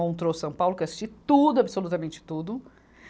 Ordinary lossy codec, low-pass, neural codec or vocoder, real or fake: none; none; none; real